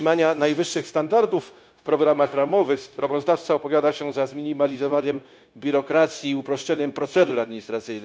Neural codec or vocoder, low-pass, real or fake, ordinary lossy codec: codec, 16 kHz, 0.9 kbps, LongCat-Audio-Codec; none; fake; none